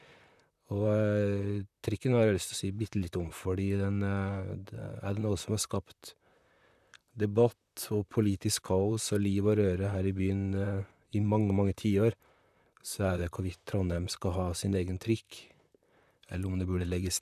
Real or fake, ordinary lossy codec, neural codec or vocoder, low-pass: fake; none; vocoder, 44.1 kHz, 128 mel bands, Pupu-Vocoder; 14.4 kHz